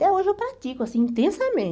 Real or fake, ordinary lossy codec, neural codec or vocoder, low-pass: real; none; none; none